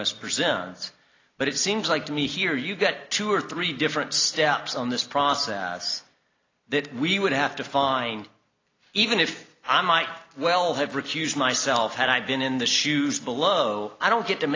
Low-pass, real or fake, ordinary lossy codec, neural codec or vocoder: 7.2 kHz; real; AAC, 32 kbps; none